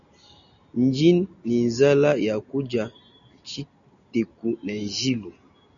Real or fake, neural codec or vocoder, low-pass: real; none; 7.2 kHz